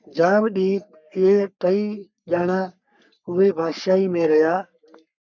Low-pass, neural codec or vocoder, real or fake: 7.2 kHz; codec, 44.1 kHz, 3.4 kbps, Pupu-Codec; fake